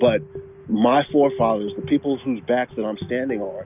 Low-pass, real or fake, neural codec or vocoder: 3.6 kHz; real; none